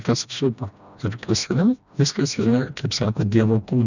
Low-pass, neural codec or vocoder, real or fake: 7.2 kHz; codec, 16 kHz, 1 kbps, FreqCodec, smaller model; fake